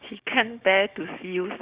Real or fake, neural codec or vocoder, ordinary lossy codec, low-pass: fake; codec, 16 kHz, 6 kbps, DAC; Opus, 64 kbps; 3.6 kHz